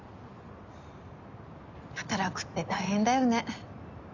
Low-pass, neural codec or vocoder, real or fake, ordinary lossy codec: 7.2 kHz; none; real; none